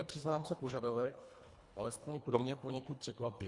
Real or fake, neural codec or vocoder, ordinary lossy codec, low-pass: fake; codec, 24 kHz, 1.5 kbps, HILCodec; Opus, 64 kbps; 10.8 kHz